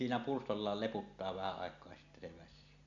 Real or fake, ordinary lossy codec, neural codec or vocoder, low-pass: real; none; none; 7.2 kHz